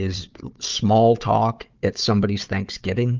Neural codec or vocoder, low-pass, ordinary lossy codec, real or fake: codec, 16 kHz, 4 kbps, FunCodec, trained on Chinese and English, 50 frames a second; 7.2 kHz; Opus, 32 kbps; fake